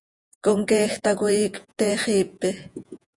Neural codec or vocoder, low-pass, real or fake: vocoder, 48 kHz, 128 mel bands, Vocos; 10.8 kHz; fake